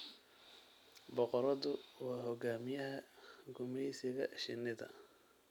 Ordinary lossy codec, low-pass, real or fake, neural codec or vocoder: none; 19.8 kHz; real; none